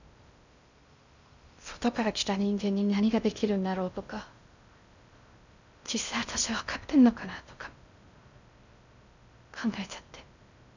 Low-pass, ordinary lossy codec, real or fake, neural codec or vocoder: 7.2 kHz; none; fake; codec, 16 kHz in and 24 kHz out, 0.6 kbps, FocalCodec, streaming, 2048 codes